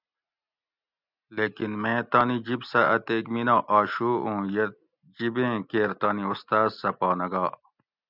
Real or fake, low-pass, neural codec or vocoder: real; 5.4 kHz; none